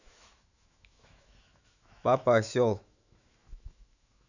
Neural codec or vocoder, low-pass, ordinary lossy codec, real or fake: autoencoder, 48 kHz, 128 numbers a frame, DAC-VAE, trained on Japanese speech; 7.2 kHz; none; fake